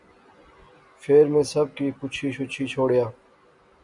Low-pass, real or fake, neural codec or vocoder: 10.8 kHz; real; none